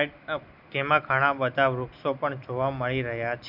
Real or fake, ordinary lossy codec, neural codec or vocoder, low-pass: real; none; none; 5.4 kHz